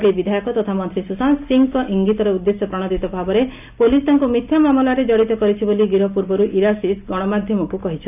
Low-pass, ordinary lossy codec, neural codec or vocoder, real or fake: 3.6 kHz; none; none; real